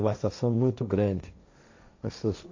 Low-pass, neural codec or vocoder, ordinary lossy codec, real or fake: 7.2 kHz; codec, 16 kHz, 1.1 kbps, Voila-Tokenizer; AAC, 48 kbps; fake